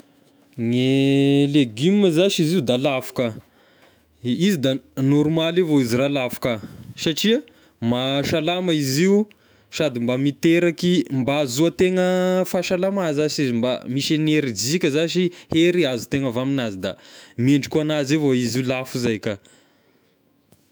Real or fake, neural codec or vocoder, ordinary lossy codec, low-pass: fake; autoencoder, 48 kHz, 128 numbers a frame, DAC-VAE, trained on Japanese speech; none; none